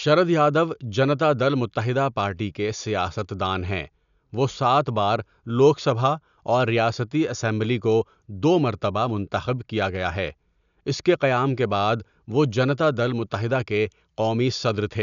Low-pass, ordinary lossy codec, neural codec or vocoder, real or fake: 7.2 kHz; none; none; real